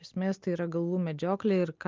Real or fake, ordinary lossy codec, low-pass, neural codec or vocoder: real; Opus, 32 kbps; 7.2 kHz; none